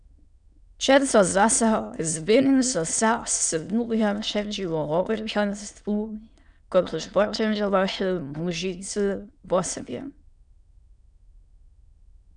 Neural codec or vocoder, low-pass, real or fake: autoencoder, 22.05 kHz, a latent of 192 numbers a frame, VITS, trained on many speakers; 9.9 kHz; fake